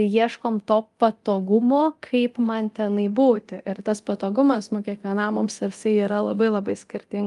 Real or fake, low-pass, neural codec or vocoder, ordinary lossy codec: fake; 10.8 kHz; codec, 24 kHz, 0.9 kbps, DualCodec; Opus, 32 kbps